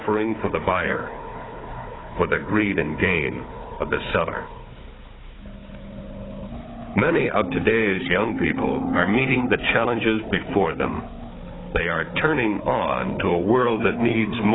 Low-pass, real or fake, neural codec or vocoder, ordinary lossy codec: 7.2 kHz; fake; codec, 16 kHz, 4 kbps, FunCodec, trained on Chinese and English, 50 frames a second; AAC, 16 kbps